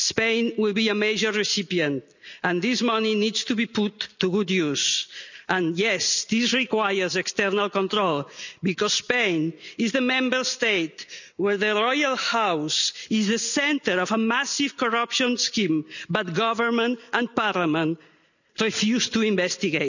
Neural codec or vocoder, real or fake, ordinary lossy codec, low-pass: none; real; none; 7.2 kHz